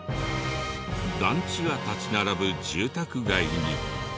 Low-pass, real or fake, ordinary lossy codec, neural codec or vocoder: none; real; none; none